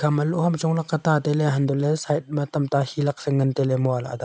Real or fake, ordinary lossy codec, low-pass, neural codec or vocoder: real; none; none; none